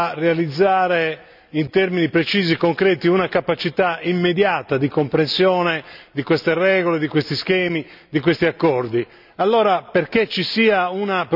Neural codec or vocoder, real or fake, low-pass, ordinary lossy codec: none; real; 5.4 kHz; none